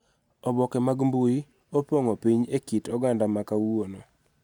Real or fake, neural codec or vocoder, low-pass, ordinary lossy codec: real; none; 19.8 kHz; none